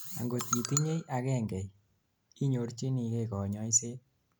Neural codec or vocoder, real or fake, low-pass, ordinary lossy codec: none; real; none; none